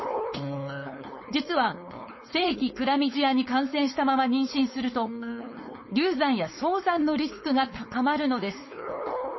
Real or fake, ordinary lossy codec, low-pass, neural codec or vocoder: fake; MP3, 24 kbps; 7.2 kHz; codec, 16 kHz, 4.8 kbps, FACodec